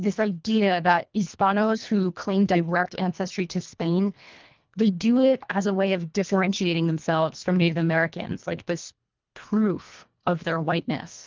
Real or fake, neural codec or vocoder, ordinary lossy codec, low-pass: fake; codec, 24 kHz, 1.5 kbps, HILCodec; Opus, 24 kbps; 7.2 kHz